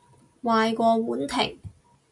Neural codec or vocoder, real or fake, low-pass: none; real; 10.8 kHz